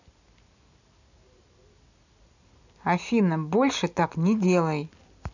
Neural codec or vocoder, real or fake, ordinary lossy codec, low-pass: none; real; none; 7.2 kHz